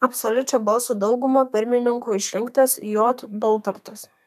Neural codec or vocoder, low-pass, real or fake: codec, 32 kHz, 1.9 kbps, SNAC; 14.4 kHz; fake